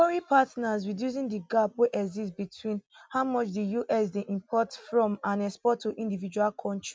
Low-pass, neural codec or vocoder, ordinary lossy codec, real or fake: none; none; none; real